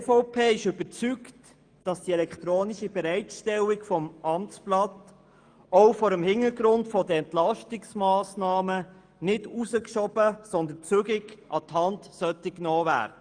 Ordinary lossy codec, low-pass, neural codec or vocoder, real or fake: Opus, 32 kbps; 9.9 kHz; autoencoder, 48 kHz, 128 numbers a frame, DAC-VAE, trained on Japanese speech; fake